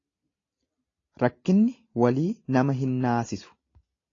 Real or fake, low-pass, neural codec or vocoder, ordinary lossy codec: real; 7.2 kHz; none; AAC, 32 kbps